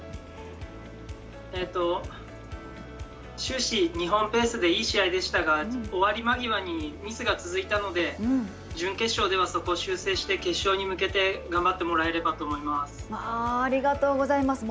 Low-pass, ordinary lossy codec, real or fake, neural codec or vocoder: none; none; real; none